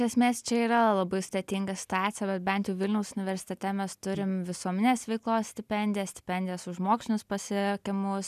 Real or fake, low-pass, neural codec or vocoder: real; 14.4 kHz; none